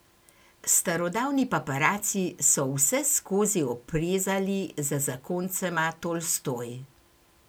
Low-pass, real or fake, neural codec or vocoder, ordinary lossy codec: none; real; none; none